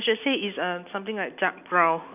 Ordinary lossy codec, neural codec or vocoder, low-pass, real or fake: none; none; 3.6 kHz; real